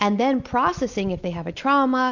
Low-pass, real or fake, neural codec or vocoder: 7.2 kHz; real; none